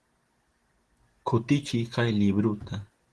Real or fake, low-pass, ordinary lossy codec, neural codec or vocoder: real; 10.8 kHz; Opus, 16 kbps; none